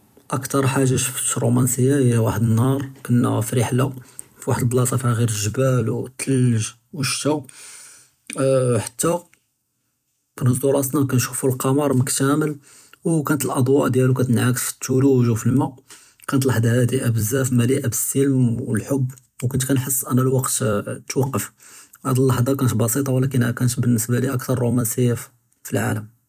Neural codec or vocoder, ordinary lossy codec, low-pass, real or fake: vocoder, 44.1 kHz, 128 mel bands every 256 samples, BigVGAN v2; none; 14.4 kHz; fake